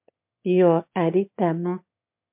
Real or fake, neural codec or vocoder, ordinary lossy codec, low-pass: fake; autoencoder, 22.05 kHz, a latent of 192 numbers a frame, VITS, trained on one speaker; MP3, 32 kbps; 3.6 kHz